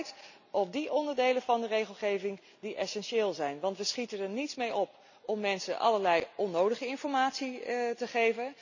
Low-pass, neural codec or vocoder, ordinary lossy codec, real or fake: 7.2 kHz; none; none; real